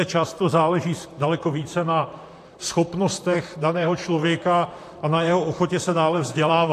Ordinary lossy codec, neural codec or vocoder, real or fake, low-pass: AAC, 64 kbps; vocoder, 44.1 kHz, 128 mel bands, Pupu-Vocoder; fake; 14.4 kHz